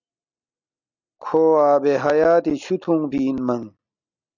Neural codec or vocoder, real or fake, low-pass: none; real; 7.2 kHz